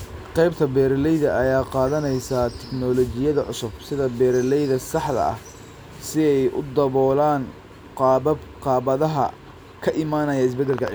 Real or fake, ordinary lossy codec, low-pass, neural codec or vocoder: real; none; none; none